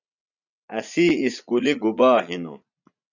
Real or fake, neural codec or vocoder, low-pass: fake; vocoder, 44.1 kHz, 80 mel bands, Vocos; 7.2 kHz